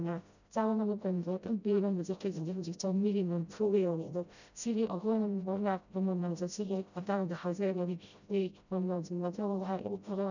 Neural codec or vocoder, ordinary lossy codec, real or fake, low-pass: codec, 16 kHz, 0.5 kbps, FreqCodec, smaller model; none; fake; 7.2 kHz